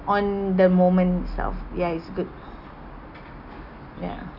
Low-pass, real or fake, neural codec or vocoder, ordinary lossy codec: 5.4 kHz; real; none; none